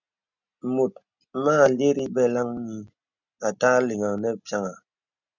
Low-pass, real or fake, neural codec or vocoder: 7.2 kHz; real; none